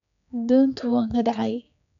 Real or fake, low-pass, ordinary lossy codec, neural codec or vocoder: fake; 7.2 kHz; none; codec, 16 kHz, 4 kbps, X-Codec, HuBERT features, trained on general audio